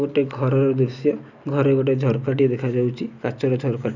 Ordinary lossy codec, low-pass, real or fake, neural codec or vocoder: none; 7.2 kHz; real; none